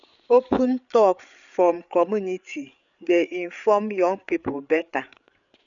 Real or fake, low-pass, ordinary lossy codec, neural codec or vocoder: fake; 7.2 kHz; none; codec, 16 kHz, 8 kbps, FreqCodec, larger model